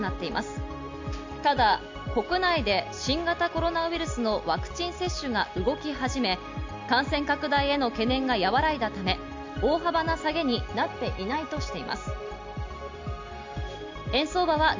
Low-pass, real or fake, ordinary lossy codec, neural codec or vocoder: 7.2 kHz; real; MP3, 64 kbps; none